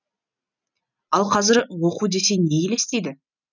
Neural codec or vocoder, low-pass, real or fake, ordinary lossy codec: none; 7.2 kHz; real; none